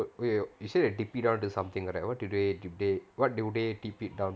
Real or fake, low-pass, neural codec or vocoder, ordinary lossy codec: real; none; none; none